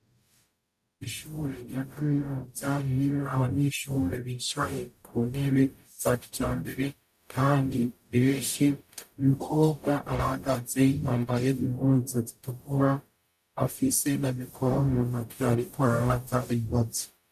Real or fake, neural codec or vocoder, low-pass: fake; codec, 44.1 kHz, 0.9 kbps, DAC; 14.4 kHz